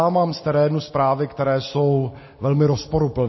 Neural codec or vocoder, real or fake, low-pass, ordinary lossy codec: none; real; 7.2 kHz; MP3, 24 kbps